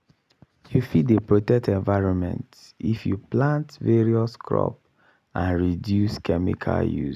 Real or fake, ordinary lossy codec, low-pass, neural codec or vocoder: real; none; 14.4 kHz; none